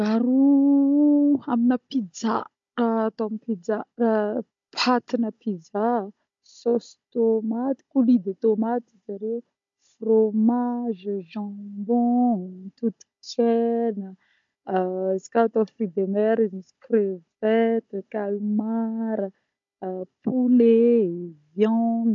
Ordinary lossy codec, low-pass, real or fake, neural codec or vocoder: MP3, 48 kbps; 7.2 kHz; fake; codec, 16 kHz, 16 kbps, FunCodec, trained on Chinese and English, 50 frames a second